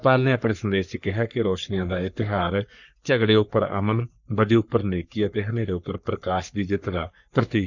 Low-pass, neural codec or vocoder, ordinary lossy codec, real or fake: 7.2 kHz; codec, 44.1 kHz, 3.4 kbps, Pupu-Codec; none; fake